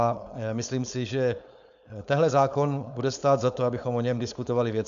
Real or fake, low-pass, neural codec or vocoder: fake; 7.2 kHz; codec, 16 kHz, 4.8 kbps, FACodec